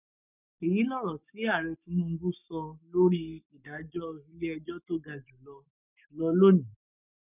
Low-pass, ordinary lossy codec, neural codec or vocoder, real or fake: 3.6 kHz; none; codec, 44.1 kHz, 7.8 kbps, Pupu-Codec; fake